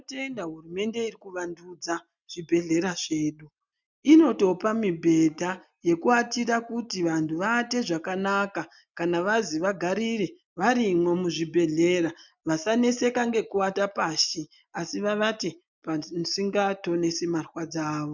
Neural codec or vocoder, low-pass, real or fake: none; 7.2 kHz; real